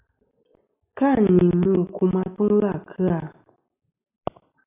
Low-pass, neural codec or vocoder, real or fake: 3.6 kHz; none; real